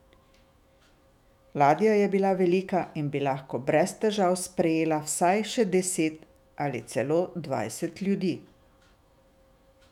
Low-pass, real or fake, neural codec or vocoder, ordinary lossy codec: 19.8 kHz; fake; autoencoder, 48 kHz, 128 numbers a frame, DAC-VAE, trained on Japanese speech; none